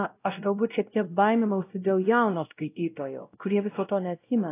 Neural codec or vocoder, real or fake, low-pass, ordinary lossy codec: codec, 16 kHz, 0.5 kbps, X-Codec, WavLM features, trained on Multilingual LibriSpeech; fake; 3.6 kHz; AAC, 24 kbps